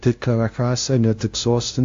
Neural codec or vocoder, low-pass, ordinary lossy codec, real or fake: codec, 16 kHz, 0.5 kbps, FunCodec, trained on LibriTTS, 25 frames a second; 7.2 kHz; AAC, 48 kbps; fake